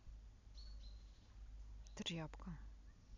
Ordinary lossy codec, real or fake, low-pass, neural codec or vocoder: none; real; 7.2 kHz; none